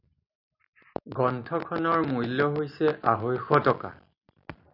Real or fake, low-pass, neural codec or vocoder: real; 5.4 kHz; none